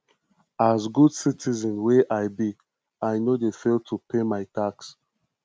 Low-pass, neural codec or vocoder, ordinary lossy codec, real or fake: none; none; none; real